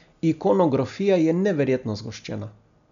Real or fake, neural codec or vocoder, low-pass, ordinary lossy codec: real; none; 7.2 kHz; MP3, 96 kbps